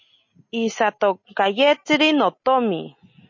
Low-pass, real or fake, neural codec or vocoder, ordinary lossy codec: 7.2 kHz; real; none; MP3, 32 kbps